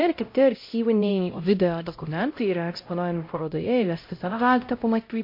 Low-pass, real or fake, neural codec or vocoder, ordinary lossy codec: 5.4 kHz; fake; codec, 16 kHz, 0.5 kbps, X-Codec, HuBERT features, trained on LibriSpeech; MP3, 32 kbps